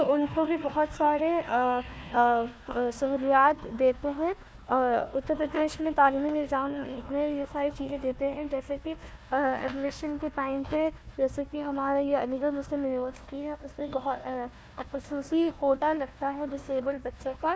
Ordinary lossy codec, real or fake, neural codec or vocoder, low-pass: none; fake; codec, 16 kHz, 1 kbps, FunCodec, trained on Chinese and English, 50 frames a second; none